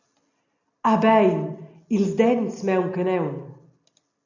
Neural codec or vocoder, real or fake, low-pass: none; real; 7.2 kHz